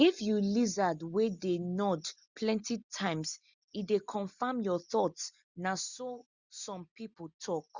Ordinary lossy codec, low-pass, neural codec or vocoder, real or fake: Opus, 64 kbps; 7.2 kHz; none; real